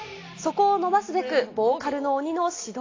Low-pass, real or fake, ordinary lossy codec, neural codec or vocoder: 7.2 kHz; real; AAC, 32 kbps; none